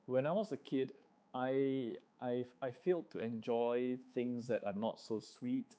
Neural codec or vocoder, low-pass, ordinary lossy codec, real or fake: codec, 16 kHz, 4 kbps, X-Codec, HuBERT features, trained on balanced general audio; none; none; fake